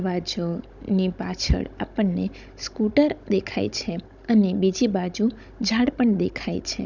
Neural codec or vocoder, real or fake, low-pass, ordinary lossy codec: codec, 16 kHz, 16 kbps, FunCodec, trained on Chinese and English, 50 frames a second; fake; 7.2 kHz; none